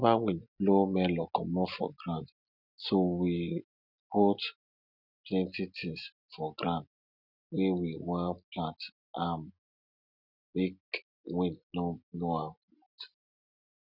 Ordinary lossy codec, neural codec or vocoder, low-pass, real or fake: none; none; 5.4 kHz; real